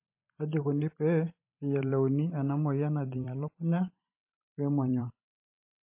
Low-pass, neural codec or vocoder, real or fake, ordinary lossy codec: 3.6 kHz; vocoder, 44.1 kHz, 128 mel bands every 512 samples, BigVGAN v2; fake; MP3, 24 kbps